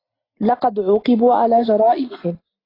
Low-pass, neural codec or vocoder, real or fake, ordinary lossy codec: 5.4 kHz; none; real; AAC, 24 kbps